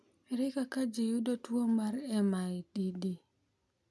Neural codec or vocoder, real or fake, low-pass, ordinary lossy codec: none; real; none; none